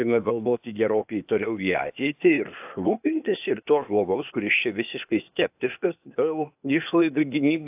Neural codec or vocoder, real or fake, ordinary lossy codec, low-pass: codec, 16 kHz, 0.8 kbps, ZipCodec; fake; AAC, 32 kbps; 3.6 kHz